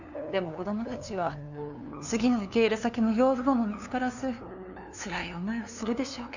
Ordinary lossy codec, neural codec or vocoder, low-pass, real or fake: none; codec, 16 kHz, 2 kbps, FunCodec, trained on LibriTTS, 25 frames a second; 7.2 kHz; fake